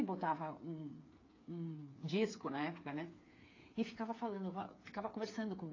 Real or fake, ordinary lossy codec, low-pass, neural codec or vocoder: fake; AAC, 32 kbps; 7.2 kHz; codec, 16 kHz, 8 kbps, FreqCodec, smaller model